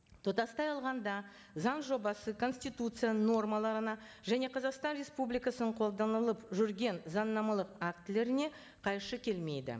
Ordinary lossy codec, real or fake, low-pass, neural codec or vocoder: none; real; none; none